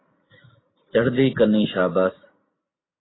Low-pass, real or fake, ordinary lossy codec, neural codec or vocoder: 7.2 kHz; real; AAC, 16 kbps; none